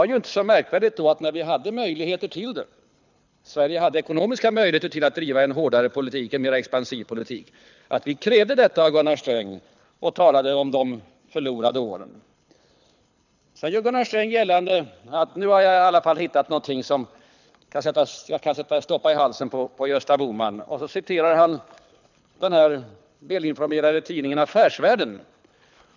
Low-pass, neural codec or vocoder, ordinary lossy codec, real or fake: 7.2 kHz; codec, 24 kHz, 6 kbps, HILCodec; none; fake